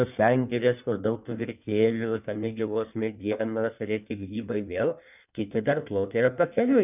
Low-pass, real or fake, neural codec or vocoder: 3.6 kHz; fake; codec, 16 kHz in and 24 kHz out, 1.1 kbps, FireRedTTS-2 codec